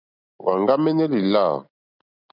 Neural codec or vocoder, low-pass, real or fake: none; 5.4 kHz; real